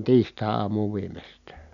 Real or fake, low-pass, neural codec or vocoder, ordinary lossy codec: real; 7.2 kHz; none; none